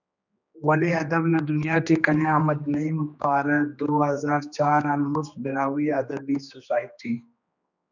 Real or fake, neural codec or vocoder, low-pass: fake; codec, 16 kHz, 2 kbps, X-Codec, HuBERT features, trained on general audio; 7.2 kHz